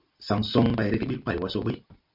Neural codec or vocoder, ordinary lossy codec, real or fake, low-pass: none; MP3, 48 kbps; real; 5.4 kHz